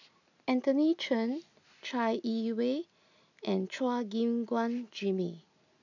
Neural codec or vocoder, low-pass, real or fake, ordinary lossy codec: none; 7.2 kHz; real; none